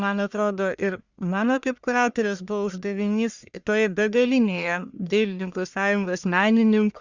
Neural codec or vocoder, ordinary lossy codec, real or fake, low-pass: codec, 44.1 kHz, 1.7 kbps, Pupu-Codec; Opus, 64 kbps; fake; 7.2 kHz